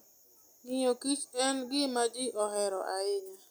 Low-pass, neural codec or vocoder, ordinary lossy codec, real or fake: none; none; none; real